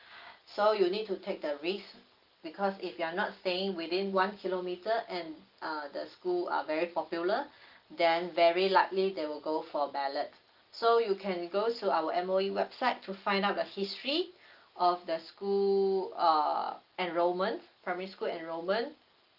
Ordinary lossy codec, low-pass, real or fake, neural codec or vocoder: Opus, 32 kbps; 5.4 kHz; real; none